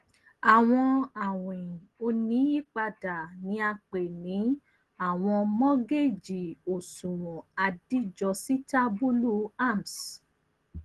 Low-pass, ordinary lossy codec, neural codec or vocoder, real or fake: 10.8 kHz; Opus, 16 kbps; none; real